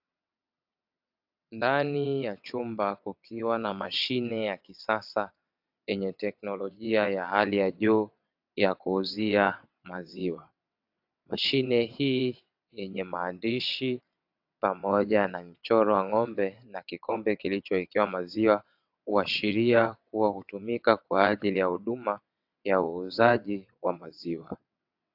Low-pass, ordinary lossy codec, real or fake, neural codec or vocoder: 5.4 kHz; AAC, 48 kbps; fake; vocoder, 22.05 kHz, 80 mel bands, WaveNeXt